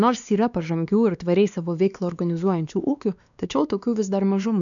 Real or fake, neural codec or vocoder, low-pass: fake; codec, 16 kHz, 2 kbps, X-Codec, WavLM features, trained on Multilingual LibriSpeech; 7.2 kHz